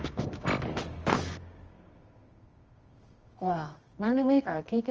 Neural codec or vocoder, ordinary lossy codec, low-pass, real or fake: codec, 24 kHz, 0.9 kbps, WavTokenizer, medium music audio release; Opus, 24 kbps; 7.2 kHz; fake